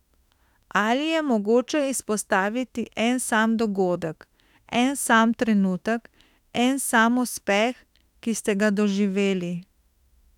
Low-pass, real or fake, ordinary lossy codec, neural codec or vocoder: 19.8 kHz; fake; none; autoencoder, 48 kHz, 32 numbers a frame, DAC-VAE, trained on Japanese speech